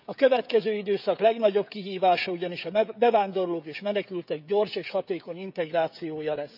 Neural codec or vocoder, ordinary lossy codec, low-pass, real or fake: codec, 16 kHz, 16 kbps, FreqCodec, smaller model; none; 5.4 kHz; fake